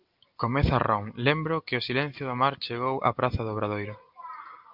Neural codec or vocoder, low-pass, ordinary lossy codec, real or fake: none; 5.4 kHz; Opus, 24 kbps; real